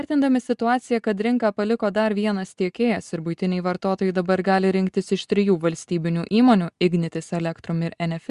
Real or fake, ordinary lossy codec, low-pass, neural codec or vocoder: real; Opus, 64 kbps; 10.8 kHz; none